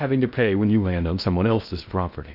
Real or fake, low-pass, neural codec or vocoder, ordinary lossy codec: fake; 5.4 kHz; codec, 16 kHz in and 24 kHz out, 0.6 kbps, FocalCodec, streaming, 2048 codes; Opus, 64 kbps